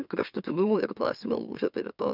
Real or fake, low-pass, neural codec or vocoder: fake; 5.4 kHz; autoencoder, 44.1 kHz, a latent of 192 numbers a frame, MeloTTS